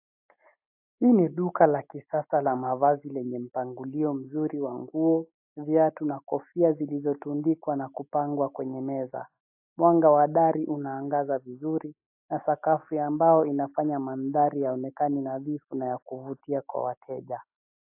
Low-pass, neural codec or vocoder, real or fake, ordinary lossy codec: 3.6 kHz; none; real; AAC, 32 kbps